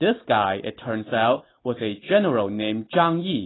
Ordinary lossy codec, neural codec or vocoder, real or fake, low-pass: AAC, 16 kbps; none; real; 7.2 kHz